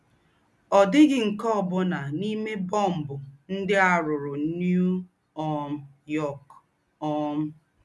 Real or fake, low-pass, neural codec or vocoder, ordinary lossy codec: real; none; none; none